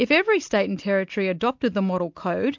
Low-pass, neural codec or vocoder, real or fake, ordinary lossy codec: 7.2 kHz; none; real; MP3, 64 kbps